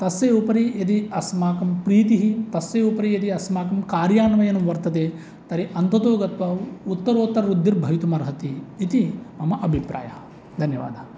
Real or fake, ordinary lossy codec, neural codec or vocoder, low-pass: real; none; none; none